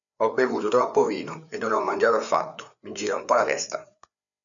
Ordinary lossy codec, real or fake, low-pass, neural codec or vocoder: MP3, 96 kbps; fake; 7.2 kHz; codec, 16 kHz, 4 kbps, FreqCodec, larger model